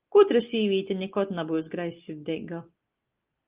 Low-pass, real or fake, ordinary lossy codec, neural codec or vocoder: 3.6 kHz; real; Opus, 32 kbps; none